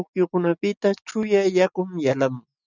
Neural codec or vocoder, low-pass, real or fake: none; 7.2 kHz; real